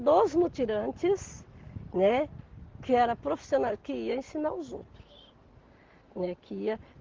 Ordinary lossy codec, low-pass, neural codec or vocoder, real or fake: Opus, 16 kbps; 7.2 kHz; none; real